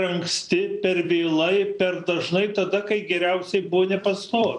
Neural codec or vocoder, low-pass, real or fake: vocoder, 44.1 kHz, 128 mel bands every 256 samples, BigVGAN v2; 10.8 kHz; fake